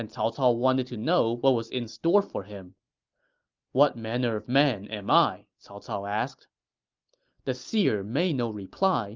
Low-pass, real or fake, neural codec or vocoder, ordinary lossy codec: 7.2 kHz; real; none; Opus, 24 kbps